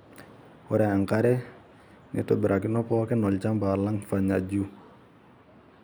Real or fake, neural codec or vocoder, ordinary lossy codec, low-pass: real; none; none; none